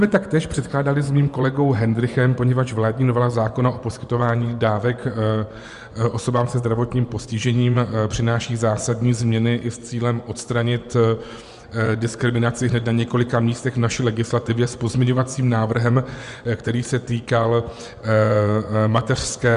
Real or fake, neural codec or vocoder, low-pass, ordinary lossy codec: fake; vocoder, 24 kHz, 100 mel bands, Vocos; 10.8 kHz; Opus, 64 kbps